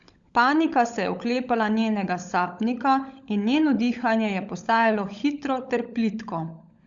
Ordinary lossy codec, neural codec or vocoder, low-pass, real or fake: Opus, 64 kbps; codec, 16 kHz, 16 kbps, FunCodec, trained on LibriTTS, 50 frames a second; 7.2 kHz; fake